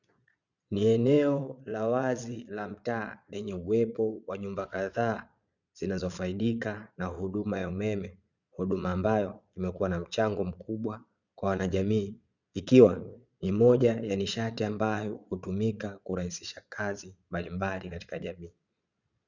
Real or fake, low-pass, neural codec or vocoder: fake; 7.2 kHz; vocoder, 22.05 kHz, 80 mel bands, Vocos